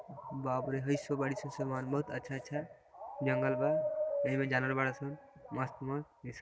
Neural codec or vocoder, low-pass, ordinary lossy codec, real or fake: none; none; none; real